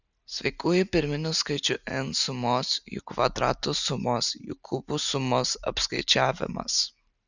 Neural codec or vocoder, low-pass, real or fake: none; 7.2 kHz; real